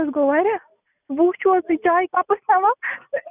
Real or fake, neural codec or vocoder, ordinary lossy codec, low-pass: real; none; none; 3.6 kHz